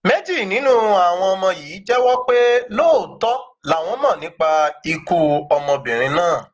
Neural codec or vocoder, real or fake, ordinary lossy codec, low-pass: none; real; Opus, 16 kbps; 7.2 kHz